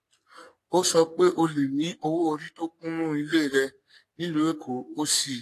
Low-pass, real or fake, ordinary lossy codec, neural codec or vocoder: 14.4 kHz; fake; AAC, 64 kbps; codec, 44.1 kHz, 3.4 kbps, Pupu-Codec